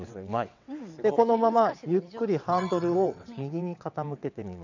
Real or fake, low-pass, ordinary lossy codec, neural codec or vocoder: fake; 7.2 kHz; none; vocoder, 22.05 kHz, 80 mel bands, WaveNeXt